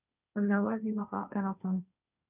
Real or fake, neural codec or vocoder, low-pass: fake; codec, 16 kHz, 1.1 kbps, Voila-Tokenizer; 3.6 kHz